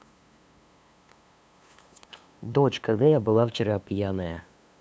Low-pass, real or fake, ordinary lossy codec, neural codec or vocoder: none; fake; none; codec, 16 kHz, 2 kbps, FunCodec, trained on LibriTTS, 25 frames a second